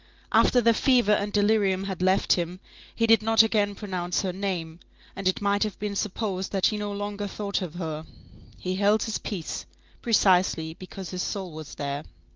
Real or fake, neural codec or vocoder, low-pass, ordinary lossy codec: real; none; 7.2 kHz; Opus, 24 kbps